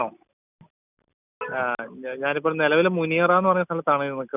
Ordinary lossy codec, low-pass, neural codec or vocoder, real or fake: none; 3.6 kHz; none; real